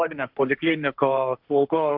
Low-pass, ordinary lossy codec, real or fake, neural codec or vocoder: 5.4 kHz; AAC, 48 kbps; fake; codec, 16 kHz, 1.1 kbps, Voila-Tokenizer